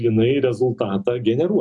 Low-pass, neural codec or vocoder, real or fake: 10.8 kHz; none; real